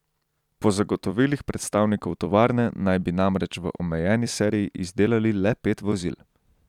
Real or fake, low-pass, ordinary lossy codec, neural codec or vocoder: fake; 19.8 kHz; none; vocoder, 44.1 kHz, 128 mel bands every 256 samples, BigVGAN v2